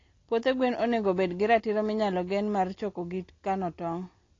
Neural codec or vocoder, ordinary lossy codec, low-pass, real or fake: none; AAC, 32 kbps; 7.2 kHz; real